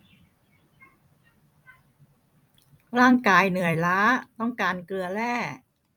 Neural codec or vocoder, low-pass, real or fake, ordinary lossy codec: vocoder, 44.1 kHz, 128 mel bands every 256 samples, BigVGAN v2; 19.8 kHz; fake; none